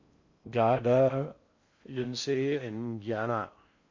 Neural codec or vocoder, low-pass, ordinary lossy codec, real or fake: codec, 16 kHz in and 24 kHz out, 0.6 kbps, FocalCodec, streaming, 2048 codes; 7.2 kHz; MP3, 48 kbps; fake